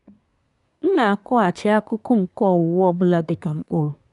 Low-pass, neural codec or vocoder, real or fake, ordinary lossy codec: 10.8 kHz; codec, 24 kHz, 1 kbps, SNAC; fake; none